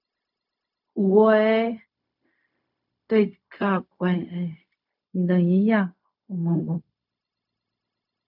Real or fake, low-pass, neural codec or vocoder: fake; 5.4 kHz; codec, 16 kHz, 0.4 kbps, LongCat-Audio-Codec